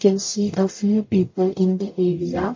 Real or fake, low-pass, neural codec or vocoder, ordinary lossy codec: fake; 7.2 kHz; codec, 44.1 kHz, 0.9 kbps, DAC; MP3, 32 kbps